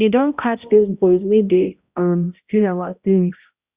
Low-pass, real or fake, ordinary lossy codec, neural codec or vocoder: 3.6 kHz; fake; Opus, 64 kbps; codec, 16 kHz, 0.5 kbps, X-Codec, HuBERT features, trained on balanced general audio